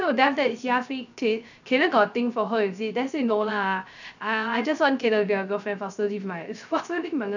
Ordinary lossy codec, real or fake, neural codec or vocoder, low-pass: none; fake; codec, 16 kHz, 0.3 kbps, FocalCodec; 7.2 kHz